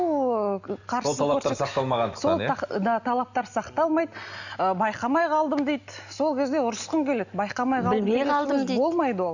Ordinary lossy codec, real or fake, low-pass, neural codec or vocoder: none; real; 7.2 kHz; none